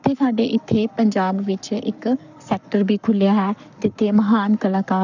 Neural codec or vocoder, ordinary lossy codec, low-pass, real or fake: codec, 44.1 kHz, 7.8 kbps, Pupu-Codec; none; 7.2 kHz; fake